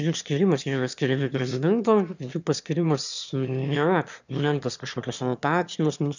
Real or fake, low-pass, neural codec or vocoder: fake; 7.2 kHz; autoencoder, 22.05 kHz, a latent of 192 numbers a frame, VITS, trained on one speaker